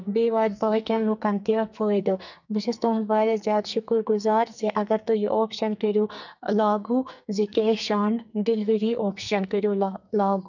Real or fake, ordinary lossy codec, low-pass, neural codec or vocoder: fake; none; 7.2 kHz; codec, 44.1 kHz, 2.6 kbps, SNAC